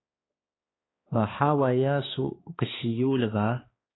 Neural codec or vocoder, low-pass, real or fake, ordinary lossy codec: codec, 16 kHz, 2 kbps, X-Codec, HuBERT features, trained on balanced general audio; 7.2 kHz; fake; AAC, 16 kbps